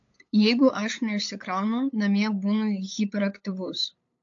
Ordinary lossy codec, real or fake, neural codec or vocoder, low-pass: AAC, 48 kbps; fake; codec, 16 kHz, 8 kbps, FunCodec, trained on LibriTTS, 25 frames a second; 7.2 kHz